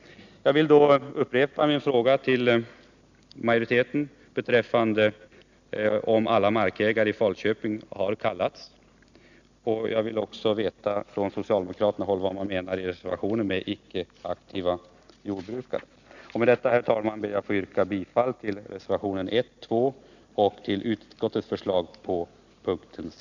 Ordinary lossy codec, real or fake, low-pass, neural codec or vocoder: none; real; 7.2 kHz; none